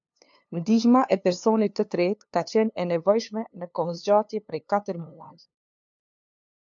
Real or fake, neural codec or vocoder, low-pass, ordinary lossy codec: fake; codec, 16 kHz, 2 kbps, FunCodec, trained on LibriTTS, 25 frames a second; 7.2 kHz; MP3, 64 kbps